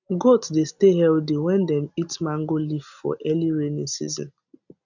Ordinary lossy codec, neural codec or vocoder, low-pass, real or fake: none; none; 7.2 kHz; real